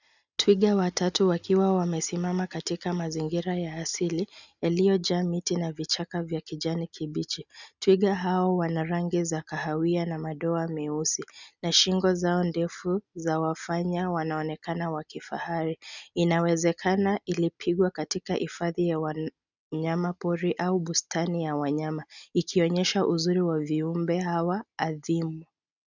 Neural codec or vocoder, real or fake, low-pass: none; real; 7.2 kHz